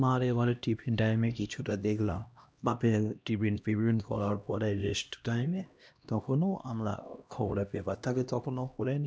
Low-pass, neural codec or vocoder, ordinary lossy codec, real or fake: none; codec, 16 kHz, 1 kbps, X-Codec, HuBERT features, trained on LibriSpeech; none; fake